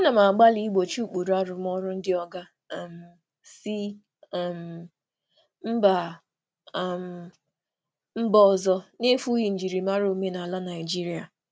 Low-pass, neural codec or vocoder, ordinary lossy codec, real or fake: none; none; none; real